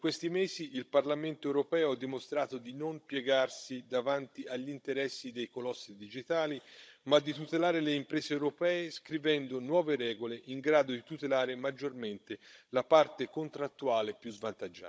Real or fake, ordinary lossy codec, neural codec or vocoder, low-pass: fake; none; codec, 16 kHz, 16 kbps, FunCodec, trained on Chinese and English, 50 frames a second; none